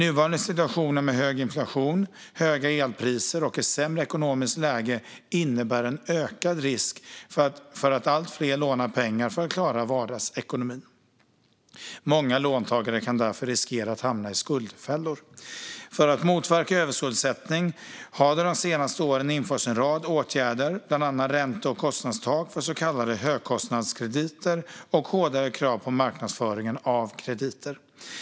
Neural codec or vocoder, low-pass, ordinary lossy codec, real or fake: none; none; none; real